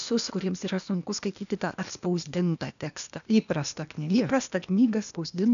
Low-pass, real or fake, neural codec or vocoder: 7.2 kHz; fake; codec, 16 kHz, 0.8 kbps, ZipCodec